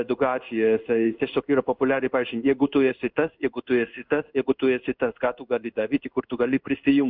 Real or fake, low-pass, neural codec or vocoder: fake; 5.4 kHz; codec, 16 kHz in and 24 kHz out, 1 kbps, XY-Tokenizer